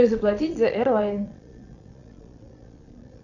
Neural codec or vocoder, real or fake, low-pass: vocoder, 22.05 kHz, 80 mel bands, Vocos; fake; 7.2 kHz